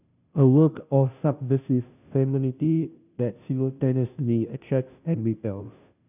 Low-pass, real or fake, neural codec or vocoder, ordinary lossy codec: 3.6 kHz; fake; codec, 16 kHz, 0.5 kbps, FunCodec, trained on Chinese and English, 25 frames a second; none